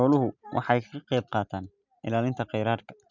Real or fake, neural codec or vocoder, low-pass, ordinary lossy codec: real; none; 7.2 kHz; none